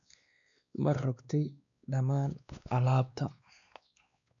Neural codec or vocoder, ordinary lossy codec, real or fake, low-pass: codec, 16 kHz, 2 kbps, X-Codec, WavLM features, trained on Multilingual LibriSpeech; none; fake; 7.2 kHz